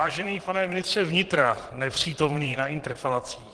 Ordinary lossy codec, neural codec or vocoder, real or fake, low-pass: Opus, 16 kbps; vocoder, 22.05 kHz, 80 mel bands, Vocos; fake; 9.9 kHz